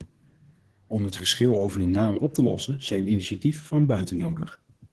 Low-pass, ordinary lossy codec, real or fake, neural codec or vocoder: 10.8 kHz; Opus, 16 kbps; fake; codec, 24 kHz, 1 kbps, SNAC